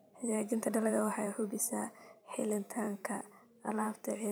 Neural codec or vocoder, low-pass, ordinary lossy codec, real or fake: vocoder, 44.1 kHz, 128 mel bands every 512 samples, BigVGAN v2; none; none; fake